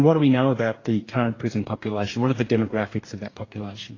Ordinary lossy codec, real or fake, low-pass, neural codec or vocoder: AAC, 32 kbps; fake; 7.2 kHz; codec, 44.1 kHz, 2.6 kbps, DAC